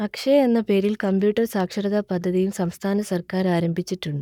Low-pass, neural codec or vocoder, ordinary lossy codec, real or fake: 19.8 kHz; codec, 44.1 kHz, 7.8 kbps, Pupu-Codec; none; fake